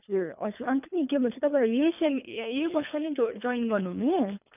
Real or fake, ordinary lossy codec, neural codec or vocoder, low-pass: fake; none; codec, 24 kHz, 3 kbps, HILCodec; 3.6 kHz